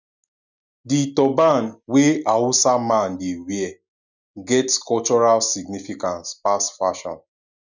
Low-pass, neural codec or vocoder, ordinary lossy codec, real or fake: 7.2 kHz; none; none; real